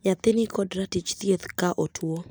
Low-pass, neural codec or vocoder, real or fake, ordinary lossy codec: none; none; real; none